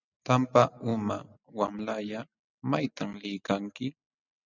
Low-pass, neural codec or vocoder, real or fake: 7.2 kHz; none; real